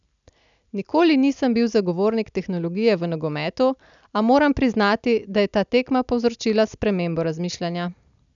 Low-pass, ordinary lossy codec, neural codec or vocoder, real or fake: 7.2 kHz; none; none; real